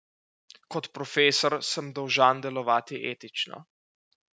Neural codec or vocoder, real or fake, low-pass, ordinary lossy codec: none; real; none; none